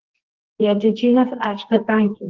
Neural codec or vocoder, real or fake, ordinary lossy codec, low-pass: codec, 24 kHz, 0.9 kbps, WavTokenizer, medium music audio release; fake; Opus, 16 kbps; 7.2 kHz